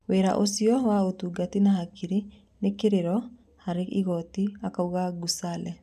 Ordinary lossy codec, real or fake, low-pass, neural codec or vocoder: none; real; 14.4 kHz; none